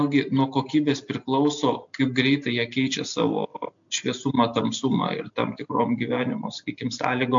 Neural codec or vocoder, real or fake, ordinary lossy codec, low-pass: none; real; MP3, 64 kbps; 7.2 kHz